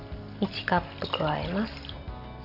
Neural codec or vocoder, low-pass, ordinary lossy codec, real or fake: none; 5.4 kHz; none; real